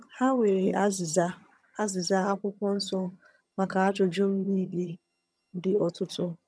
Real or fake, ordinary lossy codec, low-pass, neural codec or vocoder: fake; none; none; vocoder, 22.05 kHz, 80 mel bands, HiFi-GAN